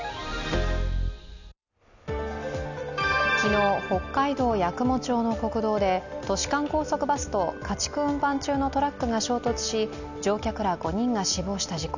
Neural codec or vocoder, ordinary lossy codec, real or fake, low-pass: none; none; real; 7.2 kHz